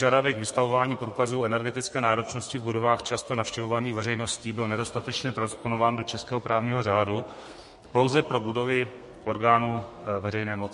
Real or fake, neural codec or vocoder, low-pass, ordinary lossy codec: fake; codec, 32 kHz, 1.9 kbps, SNAC; 14.4 kHz; MP3, 48 kbps